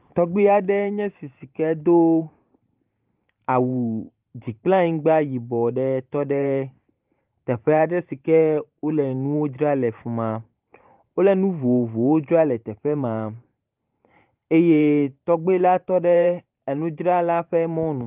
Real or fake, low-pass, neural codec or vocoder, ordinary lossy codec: fake; 3.6 kHz; vocoder, 44.1 kHz, 128 mel bands every 512 samples, BigVGAN v2; Opus, 24 kbps